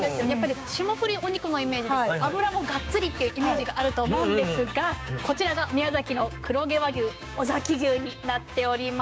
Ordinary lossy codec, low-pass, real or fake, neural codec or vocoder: none; none; fake; codec, 16 kHz, 6 kbps, DAC